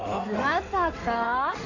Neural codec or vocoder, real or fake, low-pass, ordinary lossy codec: codec, 16 kHz in and 24 kHz out, 2.2 kbps, FireRedTTS-2 codec; fake; 7.2 kHz; none